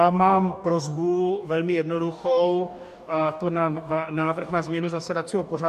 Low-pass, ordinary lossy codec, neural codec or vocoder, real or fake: 14.4 kHz; AAC, 96 kbps; codec, 44.1 kHz, 2.6 kbps, DAC; fake